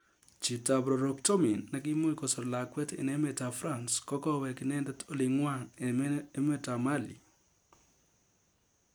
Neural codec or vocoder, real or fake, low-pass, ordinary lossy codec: none; real; none; none